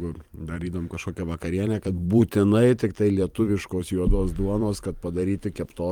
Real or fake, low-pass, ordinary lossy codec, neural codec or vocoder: fake; 19.8 kHz; Opus, 32 kbps; vocoder, 44.1 kHz, 128 mel bands every 512 samples, BigVGAN v2